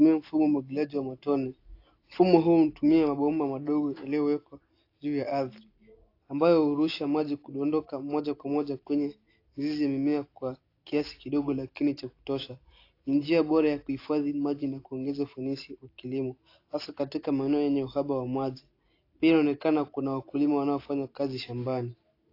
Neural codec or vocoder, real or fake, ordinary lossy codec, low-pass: none; real; AAC, 32 kbps; 5.4 kHz